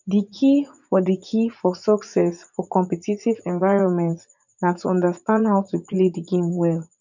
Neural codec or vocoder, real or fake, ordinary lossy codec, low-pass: vocoder, 44.1 kHz, 80 mel bands, Vocos; fake; none; 7.2 kHz